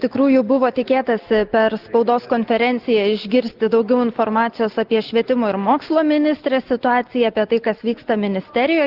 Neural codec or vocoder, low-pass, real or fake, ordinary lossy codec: none; 5.4 kHz; real; Opus, 16 kbps